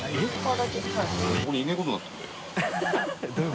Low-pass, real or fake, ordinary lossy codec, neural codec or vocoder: none; real; none; none